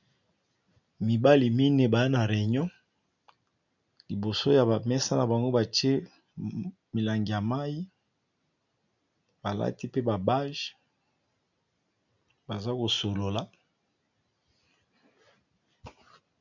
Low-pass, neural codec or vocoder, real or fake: 7.2 kHz; none; real